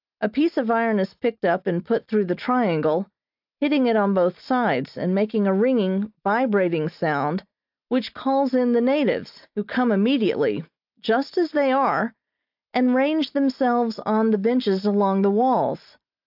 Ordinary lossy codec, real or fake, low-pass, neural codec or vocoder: AAC, 48 kbps; real; 5.4 kHz; none